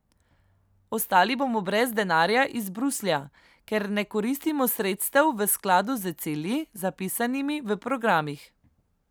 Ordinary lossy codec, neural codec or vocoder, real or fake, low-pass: none; none; real; none